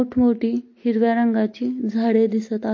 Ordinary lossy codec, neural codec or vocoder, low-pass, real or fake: MP3, 32 kbps; none; 7.2 kHz; real